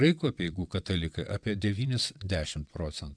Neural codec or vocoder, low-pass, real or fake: vocoder, 22.05 kHz, 80 mel bands, WaveNeXt; 9.9 kHz; fake